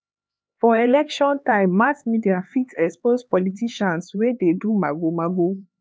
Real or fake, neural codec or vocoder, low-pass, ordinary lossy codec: fake; codec, 16 kHz, 4 kbps, X-Codec, HuBERT features, trained on LibriSpeech; none; none